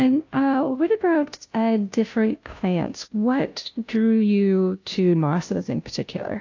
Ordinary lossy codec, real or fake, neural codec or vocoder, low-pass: AAC, 48 kbps; fake; codec, 16 kHz, 0.5 kbps, FunCodec, trained on Chinese and English, 25 frames a second; 7.2 kHz